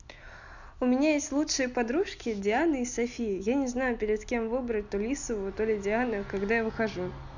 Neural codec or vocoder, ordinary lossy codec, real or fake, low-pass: none; none; real; 7.2 kHz